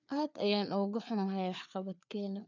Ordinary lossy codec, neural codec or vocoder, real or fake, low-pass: none; codec, 16 kHz, 4 kbps, FreqCodec, larger model; fake; 7.2 kHz